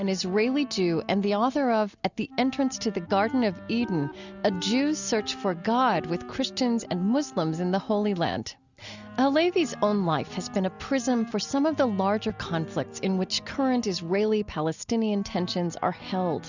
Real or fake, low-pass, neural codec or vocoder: real; 7.2 kHz; none